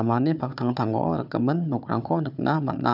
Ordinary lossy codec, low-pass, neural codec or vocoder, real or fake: none; 5.4 kHz; codec, 16 kHz, 4 kbps, FunCodec, trained on Chinese and English, 50 frames a second; fake